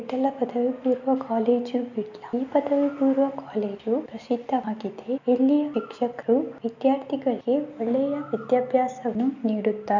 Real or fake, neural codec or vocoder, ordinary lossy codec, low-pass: real; none; none; 7.2 kHz